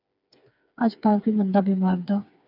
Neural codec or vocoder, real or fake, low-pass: codec, 16 kHz, 4 kbps, FreqCodec, smaller model; fake; 5.4 kHz